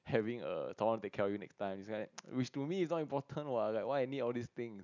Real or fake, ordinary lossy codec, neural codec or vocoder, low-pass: real; none; none; 7.2 kHz